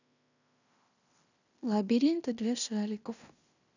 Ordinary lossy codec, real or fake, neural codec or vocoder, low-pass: none; fake; codec, 16 kHz in and 24 kHz out, 0.9 kbps, LongCat-Audio-Codec, fine tuned four codebook decoder; 7.2 kHz